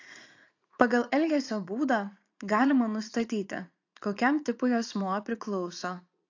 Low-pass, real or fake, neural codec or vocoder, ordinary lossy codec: 7.2 kHz; fake; vocoder, 44.1 kHz, 80 mel bands, Vocos; AAC, 48 kbps